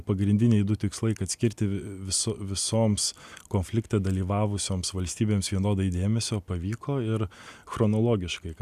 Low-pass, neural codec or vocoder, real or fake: 14.4 kHz; none; real